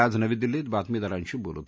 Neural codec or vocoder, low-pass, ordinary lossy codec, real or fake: none; none; none; real